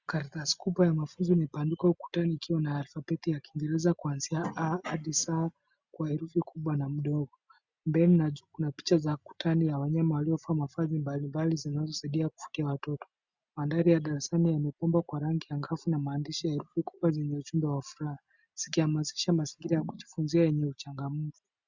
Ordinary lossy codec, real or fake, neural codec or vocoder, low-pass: Opus, 64 kbps; real; none; 7.2 kHz